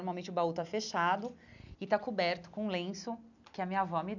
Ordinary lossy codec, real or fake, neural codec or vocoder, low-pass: none; real; none; 7.2 kHz